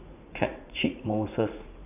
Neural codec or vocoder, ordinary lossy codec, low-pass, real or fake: vocoder, 44.1 kHz, 80 mel bands, Vocos; none; 3.6 kHz; fake